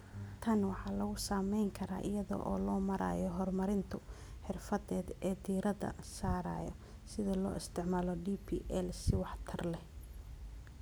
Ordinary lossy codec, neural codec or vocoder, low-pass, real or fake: none; none; none; real